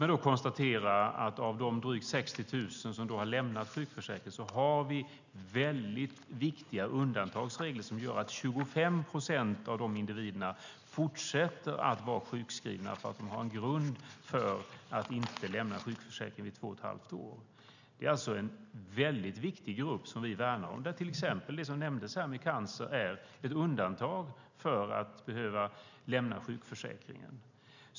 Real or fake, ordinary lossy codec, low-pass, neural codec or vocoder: real; none; 7.2 kHz; none